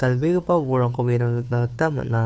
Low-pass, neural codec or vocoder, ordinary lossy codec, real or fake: none; codec, 16 kHz, 2 kbps, FunCodec, trained on Chinese and English, 25 frames a second; none; fake